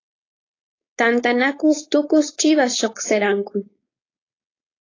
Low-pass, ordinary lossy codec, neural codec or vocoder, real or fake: 7.2 kHz; AAC, 32 kbps; codec, 16 kHz, 4.8 kbps, FACodec; fake